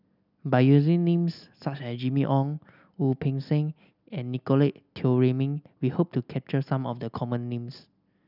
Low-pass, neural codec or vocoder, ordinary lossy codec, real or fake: 5.4 kHz; none; none; real